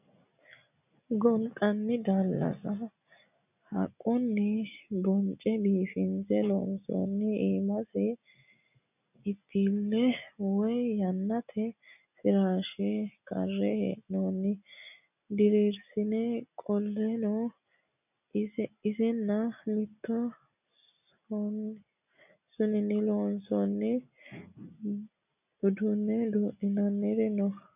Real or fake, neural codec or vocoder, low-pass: real; none; 3.6 kHz